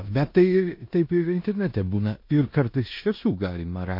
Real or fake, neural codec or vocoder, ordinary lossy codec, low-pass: fake; codec, 16 kHz in and 24 kHz out, 0.9 kbps, LongCat-Audio-Codec, four codebook decoder; MP3, 32 kbps; 5.4 kHz